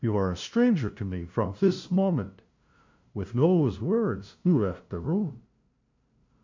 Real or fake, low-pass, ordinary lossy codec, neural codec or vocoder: fake; 7.2 kHz; MP3, 48 kbps; codec, 16 kHz, 0.5 kbps, FunCodec, trained on LibriTTS, 25 frames a second